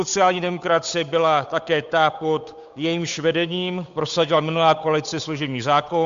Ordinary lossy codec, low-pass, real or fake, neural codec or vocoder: MP3, 64 kbps; 7.2 kHz; fake; codec, 16 kHz, 8 kbps, FunCodec, trained on Chinese and English, 25 frames a second